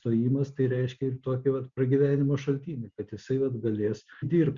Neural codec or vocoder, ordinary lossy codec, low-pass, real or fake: none; Opus, 64 kbps; 7.2 kHz; real